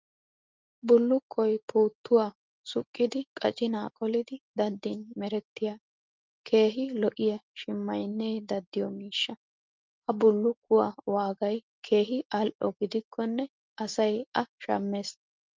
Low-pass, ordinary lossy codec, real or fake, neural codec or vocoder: 7.2 kHz; Opus, 24 kbps; real; none